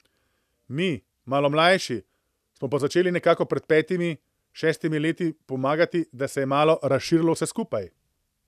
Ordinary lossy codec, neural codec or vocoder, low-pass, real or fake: none; none; 14.4 kHz; real